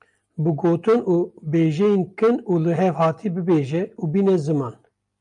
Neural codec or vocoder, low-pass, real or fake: none; 10.8 kHz; real